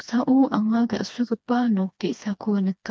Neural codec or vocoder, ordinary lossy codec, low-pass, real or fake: codec, 16 kHz, 2 kbps, FreqCodec, smaller model; none; none; fake